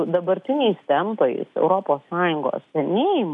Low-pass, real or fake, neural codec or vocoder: 10.8 kHz; real; none